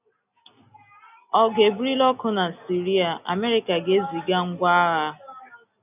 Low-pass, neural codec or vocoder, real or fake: 3.6 kHz; none; real